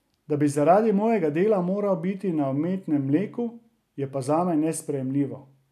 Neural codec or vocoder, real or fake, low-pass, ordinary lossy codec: none; real; 14.4 kHz; none